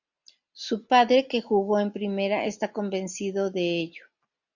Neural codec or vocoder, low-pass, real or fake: none; 7.2 kHz; real